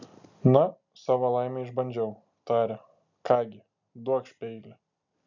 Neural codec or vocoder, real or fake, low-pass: none; real; 7.2 kHz